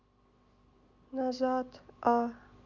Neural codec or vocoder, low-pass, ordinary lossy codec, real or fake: none; 7.2 kHz; none; real